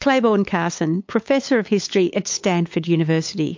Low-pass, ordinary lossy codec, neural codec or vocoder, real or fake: 7.2 kHz; MP3, 48 kbps; codec, 16 kHz, 8 kbps, FunCodec, trained on LibriTTS, 25 frames a second; fake